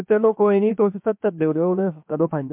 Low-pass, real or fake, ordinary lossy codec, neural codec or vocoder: 3.6 kHz; fake; MP3, 32 kbps; codec, 16 kHz, 0.7 kbps, FocalCodec